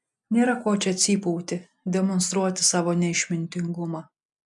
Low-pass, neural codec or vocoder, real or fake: 10.8 kHz; none; real